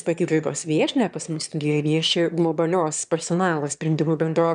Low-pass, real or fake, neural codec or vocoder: 9.9 kHz; fake; autoencoder, 22.05 kHz, a latent of 192 numbers a frame, VITS, trained on one speaker